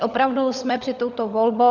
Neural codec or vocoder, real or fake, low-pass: codec, 16 kHz, 16 kbps, FunCodec, trained on LibriTTS, 50 frames a second; fake; 7.2 kHz